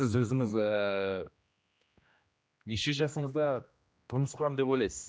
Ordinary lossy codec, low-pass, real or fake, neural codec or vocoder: none; none; fake; codec, 16 kHz, 1 kbps, X-Codec, HuBERT features, trained on general audio